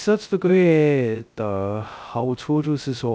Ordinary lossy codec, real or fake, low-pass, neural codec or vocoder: none; fake; none; codec, 16 kHz, 0.2 kbps, FocalCodec